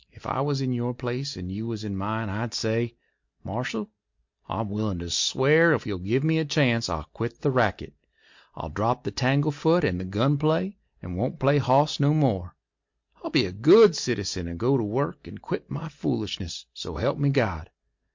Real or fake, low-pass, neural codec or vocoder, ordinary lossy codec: real; 7.2 kHz; none; MP3, 48 kbps